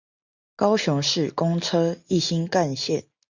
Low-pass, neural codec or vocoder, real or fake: 7.2 kHz; none; real